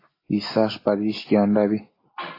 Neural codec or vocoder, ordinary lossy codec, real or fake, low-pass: none; AAC, 24 kbps; real; 5.4 kHz